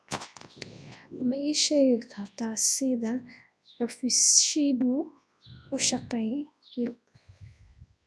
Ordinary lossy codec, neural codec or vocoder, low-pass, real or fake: none; codec, 24 kHz, 0.9 kbps, WavTokenizer, large speech release; none; fake